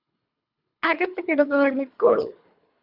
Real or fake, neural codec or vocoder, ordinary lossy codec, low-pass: fake; codec, 24 kHz, 3 kbps, HILCodec; AAC, 48 kbps; 5.4 kHz